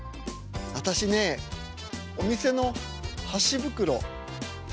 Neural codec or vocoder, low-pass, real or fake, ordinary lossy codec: none; none; real; none